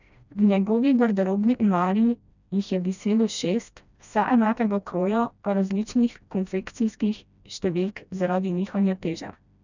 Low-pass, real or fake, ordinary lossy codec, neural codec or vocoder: 7.2 kHz; fake; none; codec, 16 kHz, 1 kbps, FreqCodec, smaller model